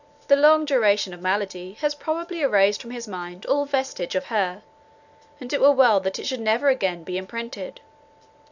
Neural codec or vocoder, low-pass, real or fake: none; 7.2 kHz; real